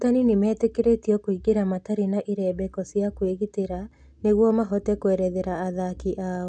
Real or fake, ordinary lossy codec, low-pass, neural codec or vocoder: real; MP3, 96 kbps; 9.9 kHz; none